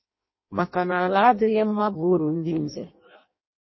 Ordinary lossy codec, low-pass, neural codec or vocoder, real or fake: MP3, 24 kbps; 7.2 kHz; codec, 16 kHz in and 24 kHz out, 0.6 kbps, FireRedTTS-2 codec; fake